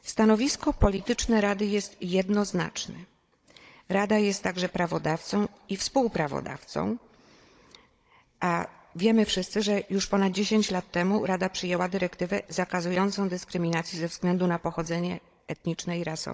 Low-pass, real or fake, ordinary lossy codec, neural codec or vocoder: none; fake; none; codec, 16 kHz, 16 kbps, FunCodec, trained on Chinese and English, 50 frames a second